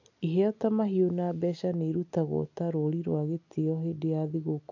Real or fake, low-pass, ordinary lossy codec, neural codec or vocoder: real; 7.2 kHz; none; none